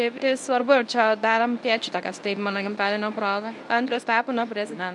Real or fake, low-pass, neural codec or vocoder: fake; 10.8 kHz; codec, 24 kHz, 0.9 kbps, WavTokenizer, medium speech release version 1